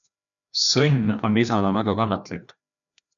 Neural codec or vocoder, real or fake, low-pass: codec, 16 kHz, 1 kbps, FreqCodec, larger model; fake; 7.2 kHz